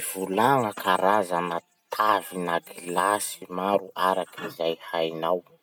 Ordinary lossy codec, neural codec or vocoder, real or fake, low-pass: none; none; real; none